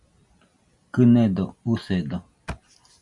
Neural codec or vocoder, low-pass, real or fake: none; 10.8 kHz; real